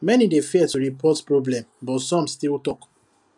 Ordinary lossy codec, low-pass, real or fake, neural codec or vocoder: none; 10.8 kHz; real; none